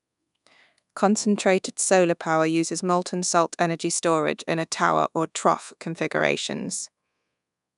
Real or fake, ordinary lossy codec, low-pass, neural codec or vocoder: fake; none; 10.8 kHz; codec, 24 kHz, 1.2 kbps, DualCodec